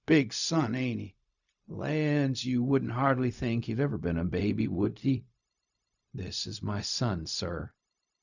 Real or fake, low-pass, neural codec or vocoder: fake; 7.2 kHz; codec, 16 kHz, 0.4 kbps, LongCat-Audio-Codec